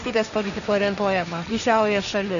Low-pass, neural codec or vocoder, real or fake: 7.2 kHz; codec, 16 kHz, 1.1 kbps, Voila-Tokenizer; fake